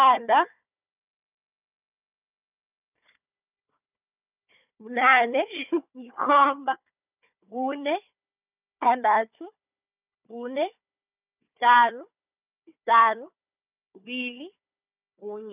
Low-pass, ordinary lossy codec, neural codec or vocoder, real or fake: 3.6 kHz; none; codec, 16 kHz, 4 kbps, FunCodec, trained on Chinese and English, 50 frames a second; fake